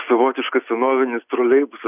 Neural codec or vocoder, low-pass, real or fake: none; 3.6 kHz; real